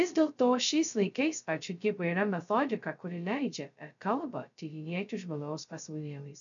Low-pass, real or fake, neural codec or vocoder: 7.2 kHz; fake; codec, 16 kHz, 0.2 kbps, FocalCodec